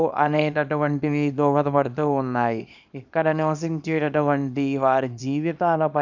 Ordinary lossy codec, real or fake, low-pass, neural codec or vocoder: none; fake; 7.2 kHz; codec, 24 kHz, 0.9 kbps, WavTokenizer, small release